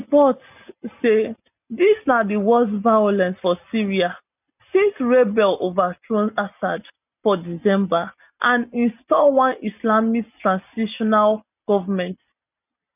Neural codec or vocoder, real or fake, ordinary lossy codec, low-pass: none; real; none; 3.6 kHz